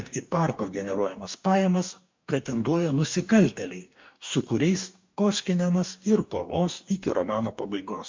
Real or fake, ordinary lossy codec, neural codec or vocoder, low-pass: fake; MP3, 64 kbps; codec, 44.1 kHz, 2.6 kbps, DAC; 7.2 kHz